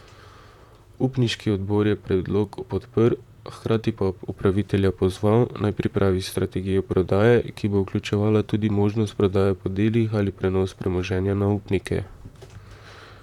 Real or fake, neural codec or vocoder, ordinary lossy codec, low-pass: fake; vocoder, 44.1 kHz, 128 mel bands, Pupu-Vocoder; none; 19.8 kHz